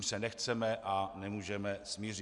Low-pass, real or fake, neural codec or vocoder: 10.8 kHz; real; none